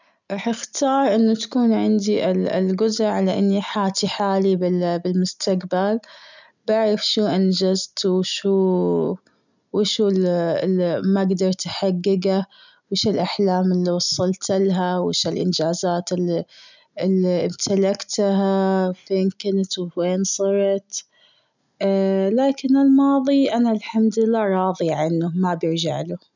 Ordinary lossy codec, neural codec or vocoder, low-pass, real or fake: none; none; 7.2 kHz; real